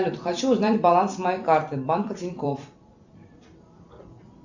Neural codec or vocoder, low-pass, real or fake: vocoder, 44.1 kHz, 128 mel bands every 256 samples, BigVGAN v2; 7.2 kHz; fake